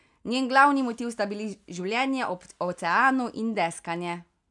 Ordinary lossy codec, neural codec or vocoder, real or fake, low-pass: none; none; real; 10.8 kHz